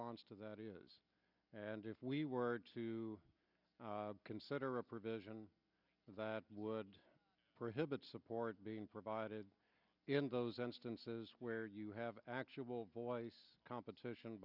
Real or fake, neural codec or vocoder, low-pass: real; none; 5.4 kHz